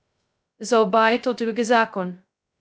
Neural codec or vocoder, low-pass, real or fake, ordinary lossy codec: codec, 16 kHz, 0.2 kbps, FocalCodec; none; fake; none